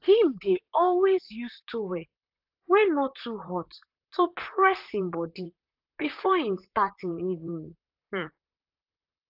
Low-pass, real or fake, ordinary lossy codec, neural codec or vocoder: 5.4 kHz; fake; AAC, 48 kbps; vocoder, 44.1 kHz, 128 mel bands, Pupu-Vocoder